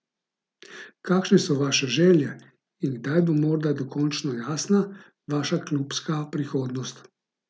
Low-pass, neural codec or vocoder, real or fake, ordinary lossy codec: none; none; real; none